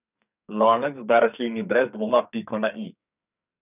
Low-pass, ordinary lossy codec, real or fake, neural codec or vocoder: 3.6 kHz; none; fake; codec, 44.1 kHz, 2.6 kbps, SNAC